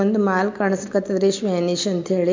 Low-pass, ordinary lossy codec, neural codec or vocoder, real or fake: 7.2 kHz; MP3, 48 kbps; none; real